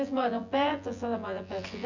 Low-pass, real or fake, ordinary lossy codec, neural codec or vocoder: 7.2 kHz; fake; none; vocoder, 24 kHz, 100 mel bands, Vocos